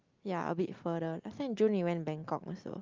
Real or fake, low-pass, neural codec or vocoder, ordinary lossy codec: real; 7.2 kHz; none; Opus, 32 kbps